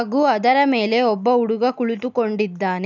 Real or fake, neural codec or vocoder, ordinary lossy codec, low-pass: real; none; none; 7.2 kHz